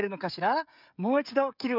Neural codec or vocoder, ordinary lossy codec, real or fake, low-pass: codec, 16 kHz, 16 kbps, FreqCodec, smaller model; none; fake; 5.4 kHz